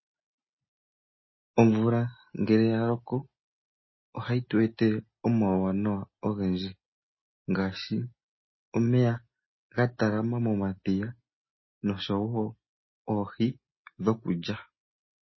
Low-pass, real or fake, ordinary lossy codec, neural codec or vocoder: 7.2 kHz; real; MP3, 24 kbps; none